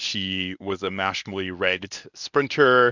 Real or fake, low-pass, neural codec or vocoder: fake; 7.2 kHz; codec, 24 kHz, 0.9 kbps, WavTokenizer, medium speech release version 1